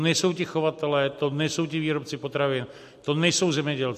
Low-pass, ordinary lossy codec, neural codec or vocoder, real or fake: 14.4 kHz; MP3, 64 kbps; none; real